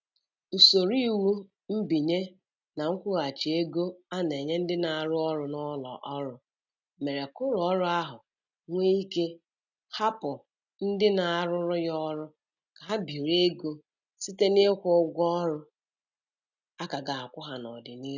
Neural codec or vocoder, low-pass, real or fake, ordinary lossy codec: none; 7.2 kHz; real; none